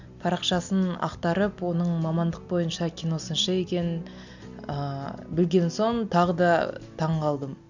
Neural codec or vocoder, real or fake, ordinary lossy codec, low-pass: none; real; none; 7.2 kHz